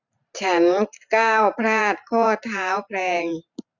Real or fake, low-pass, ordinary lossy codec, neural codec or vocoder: fake; 7.2 kHz; none; vocoder, 44.1 kHz, 128 mel bands every 512 samples, BigVGAN v2